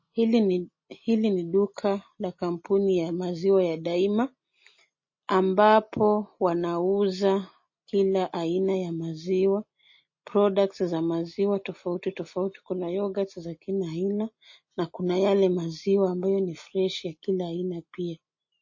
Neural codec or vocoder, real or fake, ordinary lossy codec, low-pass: none; real; MP3, 32 kbps; 7.2 kHz